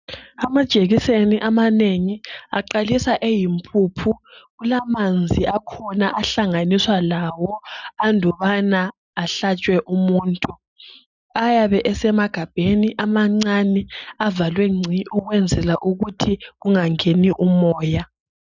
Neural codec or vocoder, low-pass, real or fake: none; 7.2 kHz; real